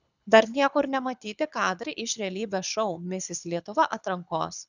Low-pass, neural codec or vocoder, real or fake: 7.2 kHz; codec, 24 kHz, 6 kbps, HILCodec; fake